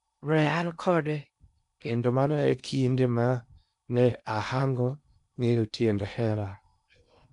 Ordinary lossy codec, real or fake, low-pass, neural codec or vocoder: none; fake; 10.8 kHz; codec, 16 kHz in and 24 kHz out, 0.8 kbps, FocalCodec, streaming, 65536 codes